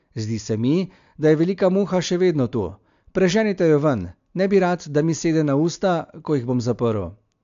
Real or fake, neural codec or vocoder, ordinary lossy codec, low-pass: real; none; AAC, 64 kbps; 7.2 kHz